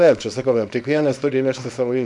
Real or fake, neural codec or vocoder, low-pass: fake; codec, 24 kHz, 0.9 kbps, WavTokenizer, small release; 10.8 kHz